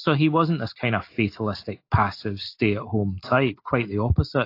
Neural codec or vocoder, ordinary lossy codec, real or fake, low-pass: none; AAC, 32 kbps; real; 5.4 kHz